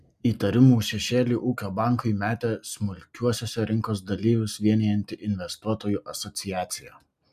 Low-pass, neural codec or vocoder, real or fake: 14.4 kHz; none; real